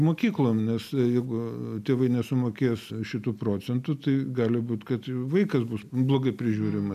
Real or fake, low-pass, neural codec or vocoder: real; 14.4 kHz; none